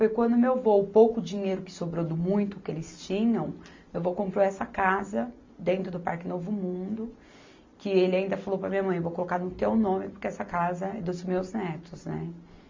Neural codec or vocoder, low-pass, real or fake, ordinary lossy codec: none; 7.2 kHz; real; MP3, 48 kbps